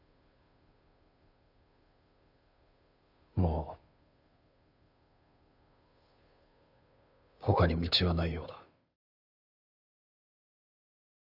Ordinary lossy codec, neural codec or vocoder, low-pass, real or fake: none; codec, 16 kHz, 2 kbps, FunCodec, trained on Chinese and English, 25 frames a second; 5.4 kHz; fake